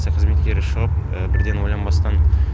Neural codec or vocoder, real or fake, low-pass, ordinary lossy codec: none; real; none; none